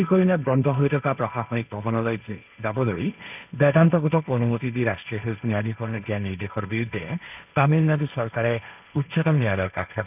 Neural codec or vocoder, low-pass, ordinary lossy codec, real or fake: codec, 16 kHz, 1.1 kbps, Voila-Tokenizer; 3.6 kHz; none; fake